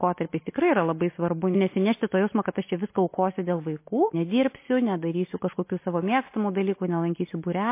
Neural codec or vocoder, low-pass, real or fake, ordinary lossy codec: none; 3.6 kHz; real; MP3, 24 kbps